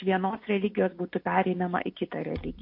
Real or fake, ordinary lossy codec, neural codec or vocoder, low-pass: real; MP3, 32 kbps; none; 5.4 kHz